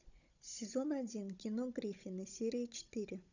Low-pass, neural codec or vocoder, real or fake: 7.2 kHz; codec, 16 kHz, 16 kbps, FunCodec, trained on Chinese and English, 50 frames a second; fake